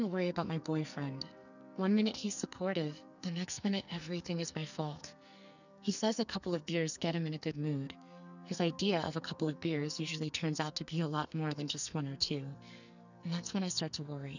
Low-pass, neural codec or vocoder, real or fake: 7.2 kHz; codec, 44.1 kHz, 2.6 kbps, SNAC; fake